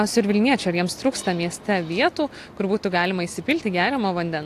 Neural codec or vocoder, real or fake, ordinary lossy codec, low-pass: none; real; AAC, 64 kbps; 14.4 kHz